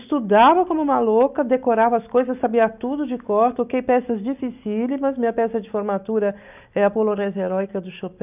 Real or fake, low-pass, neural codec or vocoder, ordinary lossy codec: real; 3.6 kHz; none; none